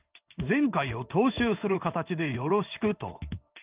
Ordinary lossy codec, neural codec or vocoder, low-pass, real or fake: Opus, 24 kbps; codec, 16 kHz in and 24 kHz out, 1 kbps, XY-Tokenizer; 3.6 kHz; fake